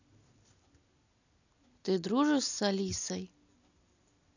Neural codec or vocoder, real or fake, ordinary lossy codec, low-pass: none; real; none; 7.2 kHz